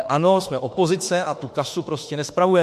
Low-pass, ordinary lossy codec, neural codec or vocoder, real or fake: 14.4 kHz; MP3, 64 kbps; autoencoder, 48 kHz, 32 numbers a frame, DAC-VAE, trained on Japanese speech; fake